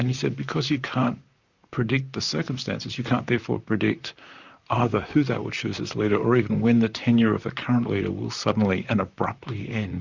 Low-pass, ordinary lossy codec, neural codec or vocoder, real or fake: 7.2 kHz; Opus, 64 kbps; vocoder, 44.1 kHz, 128 mel bands, Pupu-Vocoder; fake